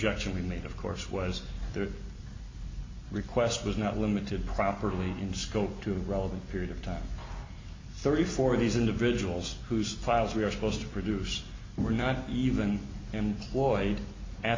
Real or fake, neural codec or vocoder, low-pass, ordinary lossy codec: real; none; 7.2 kHz; MP3, 32 kbps